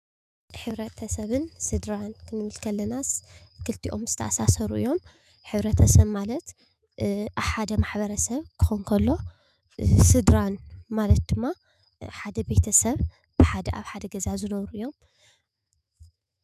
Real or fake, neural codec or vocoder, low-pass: fake; autoencoder, 48 kHz, 128 numbers a frame, DAC-VAE, trained on Japanese speech; 14.4 kHz